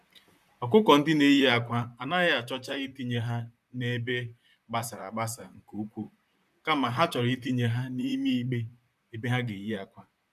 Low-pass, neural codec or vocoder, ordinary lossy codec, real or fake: 14.4 kHz; vocoder, 44.1 kHz, 128 mel bands, Pupu-Vocoder; none; fake